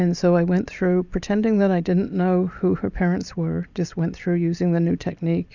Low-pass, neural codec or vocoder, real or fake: 7.2 kHz; none; real